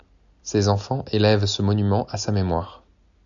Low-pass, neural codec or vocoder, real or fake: 7.2 kHz; none; real